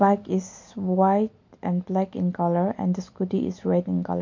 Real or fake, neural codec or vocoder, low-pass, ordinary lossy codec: real; none; 7.2 kHz; MP3, 48 kbps